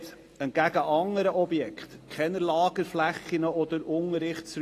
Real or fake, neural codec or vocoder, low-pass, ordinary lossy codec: real; none; 14.4 kHz; AAC, 48 kbps